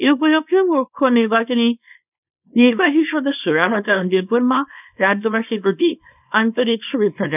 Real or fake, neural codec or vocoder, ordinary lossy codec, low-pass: fake; codec, 24 kHz, 0.9 kbps, WavTokenizer, small release; none; 3.6 kHz